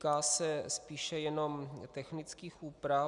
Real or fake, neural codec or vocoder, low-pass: real; none; 10.8 kHz